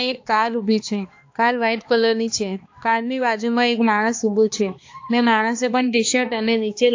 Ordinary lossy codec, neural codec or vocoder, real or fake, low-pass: AAC, 48 kbps; codec, 16 kHz, 2 kbps, X-Codec, HuBERT features, trained on balanced general audio; fake; 7.2 kHz